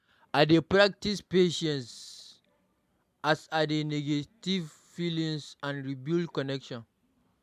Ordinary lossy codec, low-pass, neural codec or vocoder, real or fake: MP3, 96 kbps; 14.4 kHz; none; real